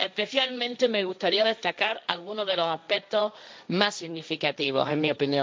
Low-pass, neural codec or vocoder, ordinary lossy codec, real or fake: none; codec, 16 kHz, 1.1 kbps, Voila-Tokenizer; none; fake